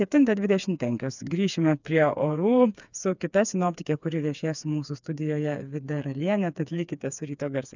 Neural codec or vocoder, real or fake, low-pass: codec, 16 kHz, 4 kbps, FreqCodec, smaller model; fake; 7.2 kHz